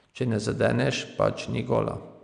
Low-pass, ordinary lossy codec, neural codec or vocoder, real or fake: 9.9 kHz; none; none; real